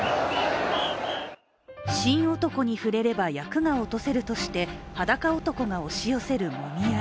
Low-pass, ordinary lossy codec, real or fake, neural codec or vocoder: none; none; real; none